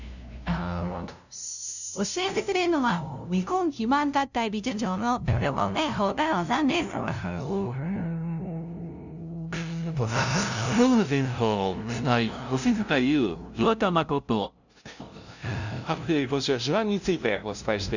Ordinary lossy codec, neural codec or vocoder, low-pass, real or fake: none; codec, 16 kHz, 0.5 kbps, FunCodec, trained on LibriTTS, 25 frames a second; 7.2 kHz; fake